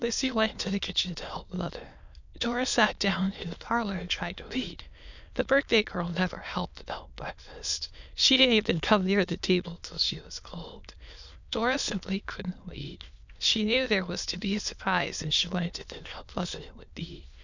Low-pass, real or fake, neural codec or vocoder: 7.2 kHz; fake; autoencoder, 22.05 kHz, a latent of 192 numbers a frame, VITS, trained on many speakers